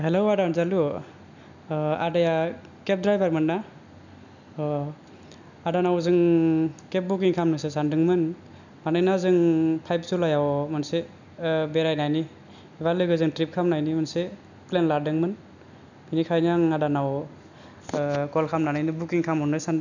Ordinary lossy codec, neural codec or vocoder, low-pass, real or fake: none; none; 7.2 kHz; real